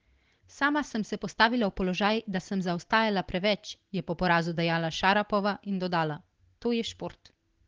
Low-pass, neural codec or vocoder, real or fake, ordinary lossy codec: 7.2 kHz; none; real; Opus, 16 kbps